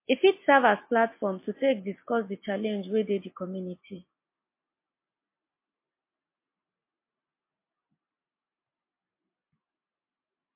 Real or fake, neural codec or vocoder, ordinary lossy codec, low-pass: real; none; MP3, 24 kbps; 3.6 kHz